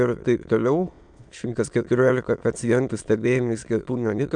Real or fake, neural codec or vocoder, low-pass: fake; autoencoder, 22.05 kHz, a latent of 192 numbers a frame, VITS, trained on many speakers; 9.9 kHz